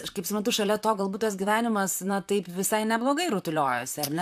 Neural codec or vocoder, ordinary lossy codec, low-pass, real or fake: vocoder, 44.1 kHz, 128 mel bands, Pupu-Vocoder; AAC, 96 kbps; 14.4 kHz; fake